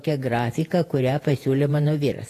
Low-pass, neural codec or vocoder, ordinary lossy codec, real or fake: 14.4 kHz; vocoder, 48 kHz, 128 mel bands, Vocos; AAC, 48 kbps; fake